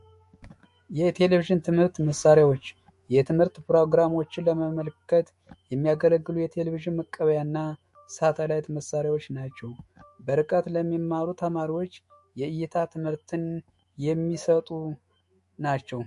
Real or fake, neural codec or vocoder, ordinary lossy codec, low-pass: fake; autoencoder, 48 kHz, 128 numbers a frame, DAC-VAE, trained on Japanese speech; MP3, 48 kbps; 14.4 kHz